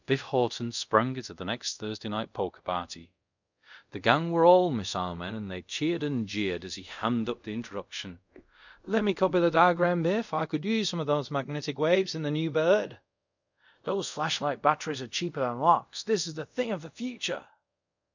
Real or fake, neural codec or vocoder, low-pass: fake; codec, 24 kHz, 0.5 kbps, DualCodec; 7.2 kHz